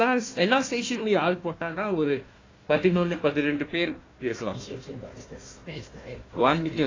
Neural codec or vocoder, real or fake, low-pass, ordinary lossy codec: codec, 16 kHz, 1 kbps, FunCodec, trained on Chinese and English, 50 frames a second; fake; 7.2 kHz; AAC, 32 kbps